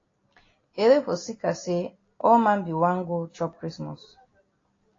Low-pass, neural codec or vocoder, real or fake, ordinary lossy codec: 7.2 kHz; none; real; AAC, 32 kbps